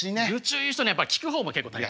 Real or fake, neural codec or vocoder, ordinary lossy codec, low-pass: real; none; none; none